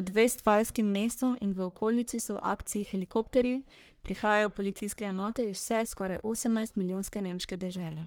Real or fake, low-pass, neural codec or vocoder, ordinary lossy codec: fake; none; codec, 44.1 kHz, 1.7 kbps, Pupu-Codec; none